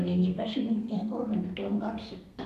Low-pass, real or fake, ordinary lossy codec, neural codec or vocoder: 14.4 kHz; fake; none; codec, 32 kHz, 1.9 kbps, SNAC